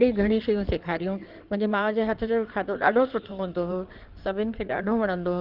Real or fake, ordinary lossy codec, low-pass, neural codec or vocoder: fake; Opus, 24 kbps; 5.4 kHz; codec, 16 kHz in and 24 kHz out, 2.2 kbps, FireRedTTS-2 codec